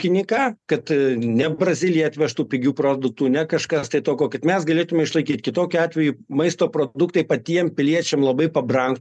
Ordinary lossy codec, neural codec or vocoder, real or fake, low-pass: MP3, 96 kbps; none; real; 10.8 kHz